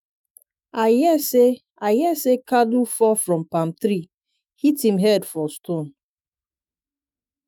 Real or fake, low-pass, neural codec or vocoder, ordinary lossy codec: fake; none; autoencoder, 48 kHz, 128 numbers a frame, DAC-VAE, trained on Japanese speech; none